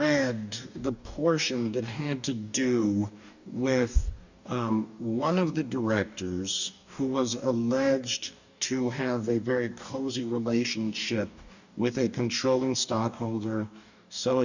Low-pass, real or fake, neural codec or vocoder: 7.2 kHz; fake; codec, 44.1 kHz, 2.6 kbps, DAC